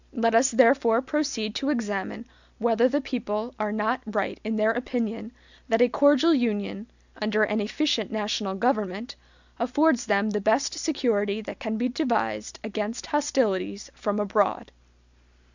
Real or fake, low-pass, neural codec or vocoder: real; 7.2 kHz; none